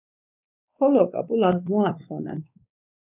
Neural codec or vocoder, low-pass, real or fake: codec, 16 kHz, 2 kbps, X-Codec, WavLM features, trained on Multilingual LibriSpeech; 3.6 kHz; fake